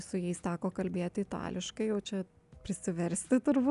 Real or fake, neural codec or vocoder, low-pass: real; none; 10.8 kHz